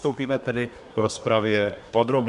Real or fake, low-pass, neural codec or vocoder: fake; 10.8 kHz; codec, 24 kHz, 1 kbps, SNAC